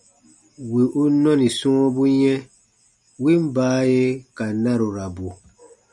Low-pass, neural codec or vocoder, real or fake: 10.8 kHz; none; real